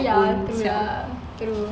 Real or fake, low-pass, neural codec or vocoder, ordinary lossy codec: real; none; none; none